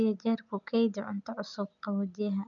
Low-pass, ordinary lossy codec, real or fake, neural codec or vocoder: 7.2 kHz; none; real; none